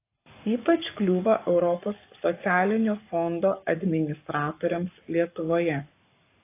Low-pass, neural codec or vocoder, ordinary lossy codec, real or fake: 3.6 kHz; codec, 44.1 kHz, 7.8 kbps, Pupu-Codec; AAC, 24 kbps; fake